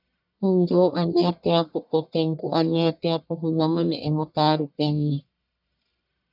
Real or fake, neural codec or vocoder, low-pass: fake; codec, 44.1 kHz, 1.7 kbps, Pupu-Codec; 5.4 kHz